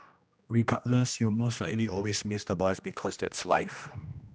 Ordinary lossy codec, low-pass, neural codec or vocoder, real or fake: none; none; codec, 16 kHz, 1 kbps, X-Codec, HuBERT features, trained on general audio; fake